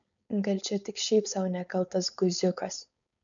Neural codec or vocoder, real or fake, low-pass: codec, 16 kHz, 4.8 kbps, FACodec; fake; 7.2 kHz